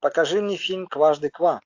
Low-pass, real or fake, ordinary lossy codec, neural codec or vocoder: 7.2 kHz; real; AAC, 48 kbps; none